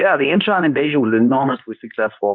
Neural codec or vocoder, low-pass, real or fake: codec, 24 kHz, 0.9 kbps, WavTokenizer, medium speech release version 2; 7.2 kHz; fake